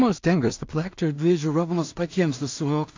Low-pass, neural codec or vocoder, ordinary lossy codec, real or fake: 7.2 kHz; codec, 16 kHz in and 24 kHz out, 0.4 kbps, LongCat-Audio-Codec, two codebook decoder; AAC, 48 kbps; fake